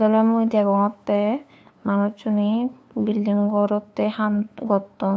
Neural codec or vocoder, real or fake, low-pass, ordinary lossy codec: codec, 16 kHz, 2 kbps, FunCodec, trained on LibriTTS, 25 frames a second; fake; none; none